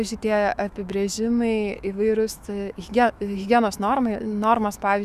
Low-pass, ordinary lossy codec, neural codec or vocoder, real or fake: 14.4 kHz; Opus, 64 kbps; autoencoder, 48 kHz, 128 numbers a frame, DAC-VAE, trained on Japanese speech; fake